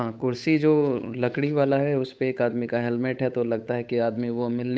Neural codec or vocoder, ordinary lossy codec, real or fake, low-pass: codec, 16 kHz, 8 kbps, FunCodec, trained on Chinese and English, 25 frames a second; none; fake; none